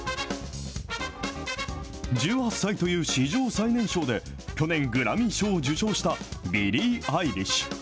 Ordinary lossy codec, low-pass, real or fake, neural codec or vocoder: none; none; real; none